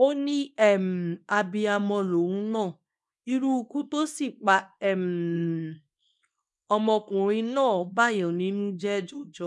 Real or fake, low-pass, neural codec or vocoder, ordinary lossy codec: fake; none; codec, 24 kHz, 0.9 kbps, WavTokenizer, small release; none